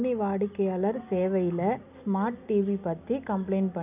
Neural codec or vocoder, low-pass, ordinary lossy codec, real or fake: none; 3.6 kHz; none; real